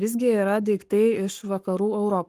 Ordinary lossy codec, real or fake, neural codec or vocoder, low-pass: Opus, 32 kbps; fake; codec, 44.1 kHz, 7.8 kbps, Pupu-Codec; 14.4 kHz